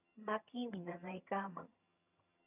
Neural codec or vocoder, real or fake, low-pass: vocoder, 22.05 kHz, 80 mel bands, HiFi-GAN; fake; 3.6 kHz